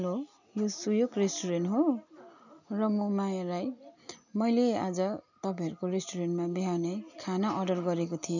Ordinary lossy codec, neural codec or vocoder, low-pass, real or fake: none; none; 7.2 kHz; real